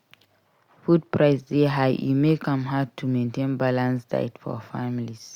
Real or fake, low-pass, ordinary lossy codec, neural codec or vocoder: real; 19.8 kHz; Opus, 64 kbps; none